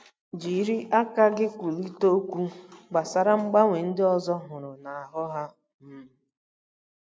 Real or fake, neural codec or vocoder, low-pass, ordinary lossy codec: real; none; none; none